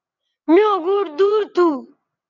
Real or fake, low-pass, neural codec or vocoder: fake; 7.2 kHz; vocoder, 22.05 kHz, 80 mel bands, WaveNeXt